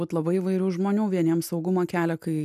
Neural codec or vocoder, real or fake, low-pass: none; real; 14.4 kHz